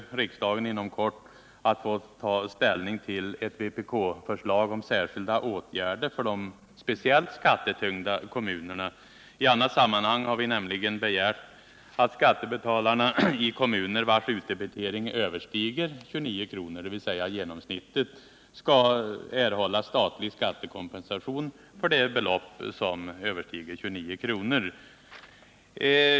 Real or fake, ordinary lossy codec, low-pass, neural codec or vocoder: real; none; none; none